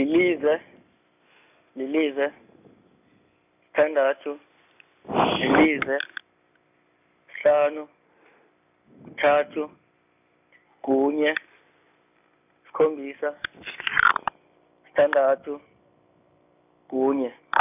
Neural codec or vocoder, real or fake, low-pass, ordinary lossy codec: none; real; 3.6 kHz; none